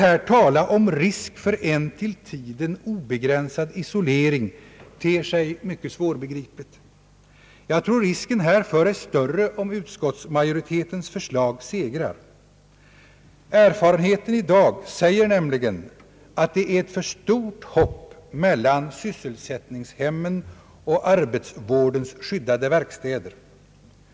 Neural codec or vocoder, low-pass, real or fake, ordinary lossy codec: none; none; real; none